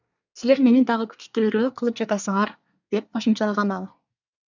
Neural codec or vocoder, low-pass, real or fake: codec, 24 kHz, 1 kbps, SNAC; 7.2 kHz; fake